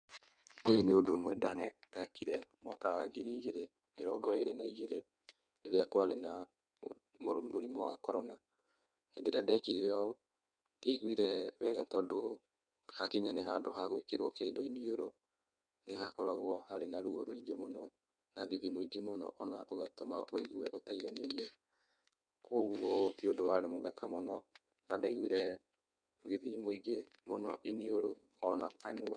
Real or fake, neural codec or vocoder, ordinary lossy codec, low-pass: fake; codec, 16 kHz in and 24 kHz out, 1.1 kbps, FireRedTTS-2 codec; none; 9.9 kHz